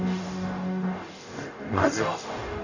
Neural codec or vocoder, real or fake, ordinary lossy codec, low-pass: codec, 44.1 kHz, 0.9 kbps, DAC; fake; none; 7.2 kHz